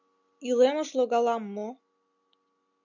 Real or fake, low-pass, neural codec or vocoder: real; 7.2 kHz; none